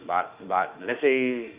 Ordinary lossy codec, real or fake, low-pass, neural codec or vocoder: Opus, 24 kbps; fake; 3.6 kHz; autoencoder, 48 kHz, 32 numbers a frame, DAC-VAE, trained on Japanese speech